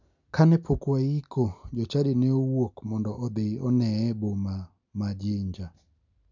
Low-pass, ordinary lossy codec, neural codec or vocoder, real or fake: 7.2 kHz; none; none; real